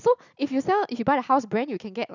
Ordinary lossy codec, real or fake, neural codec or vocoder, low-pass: none; real; none; 7.2 kHz